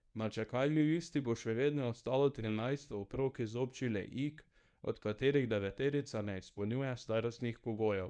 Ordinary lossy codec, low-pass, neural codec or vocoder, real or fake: none; 9.9 kHz; codec, 24 kHz, 0.9 kbps, WavTokenizer, medium speech release version 1; fake